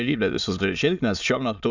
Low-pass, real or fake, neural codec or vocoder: 7.2 kHz; fake; autoencoder, 22.05 kHz, a latent of 192 numbers a frame, VITS, trained on many speakers